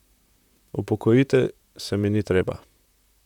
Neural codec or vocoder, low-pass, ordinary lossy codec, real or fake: vocoder, 44.1 kHz, 128 mel bands, Pupu-Vocoder; 19.8 kHz; none; fake